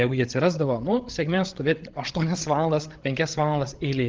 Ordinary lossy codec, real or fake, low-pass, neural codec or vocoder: Opus, 16 kbps; fake; 7.2 kHz; codec, 16 kHz, 16 kbps, FreqCodec, larger model